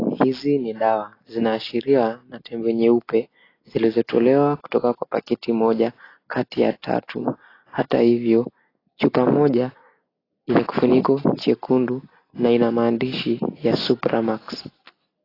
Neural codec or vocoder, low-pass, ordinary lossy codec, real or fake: none; 5.4 kHz; AAC, 24 kbps; real